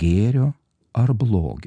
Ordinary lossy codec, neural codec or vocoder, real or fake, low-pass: MP3, 96 kbps; none; real; 9.9 kHz